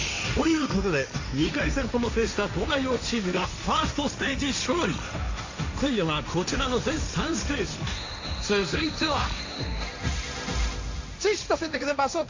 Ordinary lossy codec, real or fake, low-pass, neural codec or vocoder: AAC, 48 kbps; fake; 7.2 kHz; codec, 16 kHz, 1.1 kbps, Voila-Tokenizer